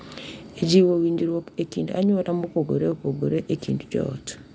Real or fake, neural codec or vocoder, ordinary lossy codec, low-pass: real; none; none; none